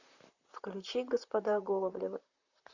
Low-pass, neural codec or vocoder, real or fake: 7.2 kHz; vocoder, 44.1 kHz, 128 mel bands, Pupu-Vocoder; fake